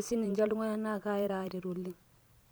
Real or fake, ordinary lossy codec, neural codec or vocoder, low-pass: fake; none; vocoder, 44.1 kHz, 128 mel bands every 512 samples, BigVGAN v2; none